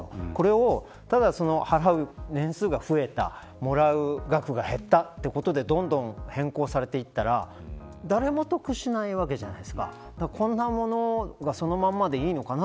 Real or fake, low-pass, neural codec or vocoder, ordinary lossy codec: real; none; none; none